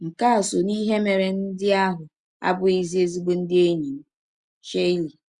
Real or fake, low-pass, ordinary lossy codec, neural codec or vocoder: real; 10.8 kHz; Opus, 64 kbps; none